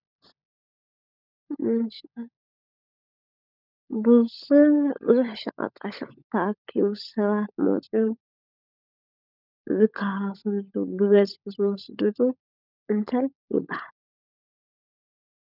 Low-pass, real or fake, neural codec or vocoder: 5.4 kHz; fake; codec, 16 kHz, 16 kbps, FunCodec, trained on LibriTTS, 50 frames a second